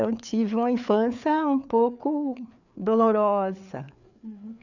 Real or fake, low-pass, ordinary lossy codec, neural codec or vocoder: fake; 7.2 kHz; none; codec, 16 kHz, 4 kbps, FreqCodec, larger model